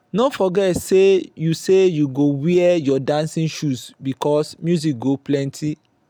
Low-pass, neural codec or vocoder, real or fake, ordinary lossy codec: 19.8 kHz; none; real; none